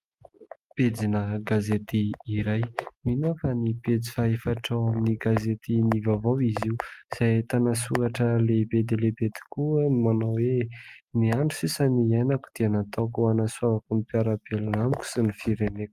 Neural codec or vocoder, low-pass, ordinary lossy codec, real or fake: none; 14.4 kHz; Opus, 32 kbps; real